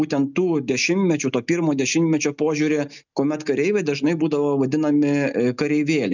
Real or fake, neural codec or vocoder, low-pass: real; none; 7.2 kHz